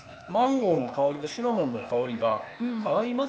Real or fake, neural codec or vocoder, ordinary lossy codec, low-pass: fake; codec, 16 kHz, 0.8 kbps, ZipCodec; none; none